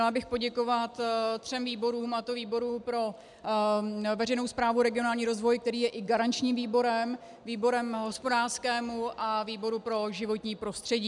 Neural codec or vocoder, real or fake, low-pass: none; real; 10.8 kHz